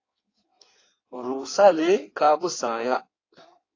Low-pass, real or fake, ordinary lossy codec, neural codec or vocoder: 7.2 kHz; fake; AAC, 32 kbps; codec, 16 kHz in and 24 kHz out, 1.1 kbps, FireRedTTS-2 codec